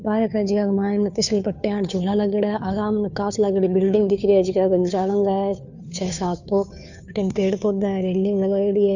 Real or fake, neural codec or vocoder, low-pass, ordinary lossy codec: fake; codec, 16 kHz, 2 kbps, FunCodec, trained on Chinese and English, 25 frames a second; 7.2 kHz; none